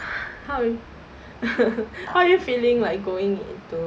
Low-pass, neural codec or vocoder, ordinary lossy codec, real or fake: none; none; none; real